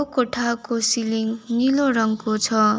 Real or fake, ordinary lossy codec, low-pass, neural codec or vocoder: real; none; none; none